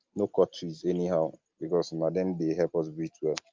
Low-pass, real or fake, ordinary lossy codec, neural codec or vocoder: 7.2 kHz; real; Opus, 24 kbps; none